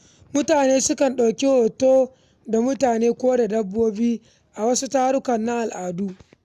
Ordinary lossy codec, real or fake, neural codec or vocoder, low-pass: none; real; none; 14.4 kHz